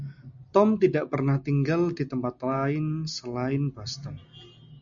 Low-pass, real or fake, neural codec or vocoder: 7.2 kHz; real; none